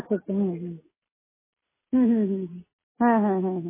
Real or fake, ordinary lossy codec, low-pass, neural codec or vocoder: real; MP3, 16 kbps; 3.6 kHz; none